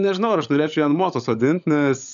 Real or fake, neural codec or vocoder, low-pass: fake; codec, 16 kHz, 16 kbps, FunCodec, trained on LibriTTS, 50 frames a second; 7.2 kHz